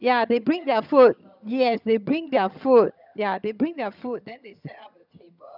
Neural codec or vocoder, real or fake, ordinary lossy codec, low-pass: vocoder, 22.05 kHz, 80 mel bands, HiFi-GAN; fake; none; 5.4 kHz